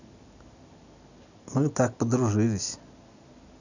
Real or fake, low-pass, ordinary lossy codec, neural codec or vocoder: real; 7.2 kHz; none; none